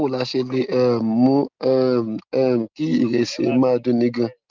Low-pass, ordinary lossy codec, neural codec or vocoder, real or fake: 7.2 kHz; Opus, 32 kbps; none; real